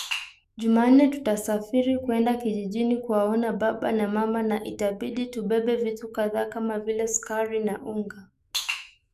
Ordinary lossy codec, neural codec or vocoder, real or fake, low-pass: none; autoencoder, 48 kHz, 128 numbers a frame, DAC-VAE, trained on Japanese speech; fake; 14.4 kHz